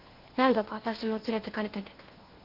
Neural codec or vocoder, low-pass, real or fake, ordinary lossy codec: codec, 16 kHz, 1 kbps, FunCodec, trained on LibriTTS, 50 frames a second; 5.4 kHz; fake; Opus, 16 kbps